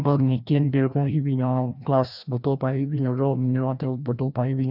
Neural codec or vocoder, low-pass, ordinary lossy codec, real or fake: codec, 16 kHz, 1 kbps, FreqCodec, larger model; 5.4 kHz; none; fake